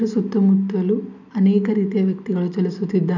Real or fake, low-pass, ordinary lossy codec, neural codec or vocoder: real; 7.2 kHz; none; none